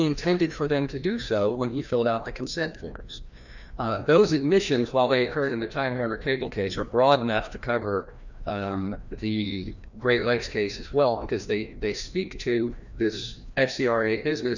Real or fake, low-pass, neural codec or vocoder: fake; 7.2 kHz; codec, 16 kHz, 1 kbps, FreqCodec, larger model